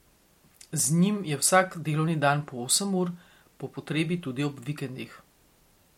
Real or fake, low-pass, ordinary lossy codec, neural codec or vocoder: real; 19.8 kHz; MP3, 64 kbps; none